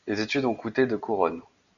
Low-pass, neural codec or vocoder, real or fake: 7.2 kHz; none; real